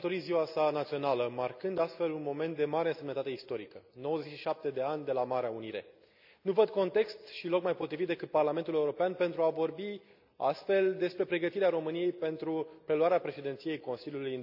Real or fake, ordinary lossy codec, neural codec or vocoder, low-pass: real; none; none; 5.4 kHz